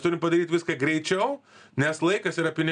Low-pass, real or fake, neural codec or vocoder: 9.9 kHz; real; none